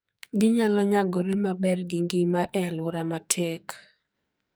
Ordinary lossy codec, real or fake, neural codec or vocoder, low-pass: none; fake; codec, 44.1 kHz, 2.6 kbps, SNAC; none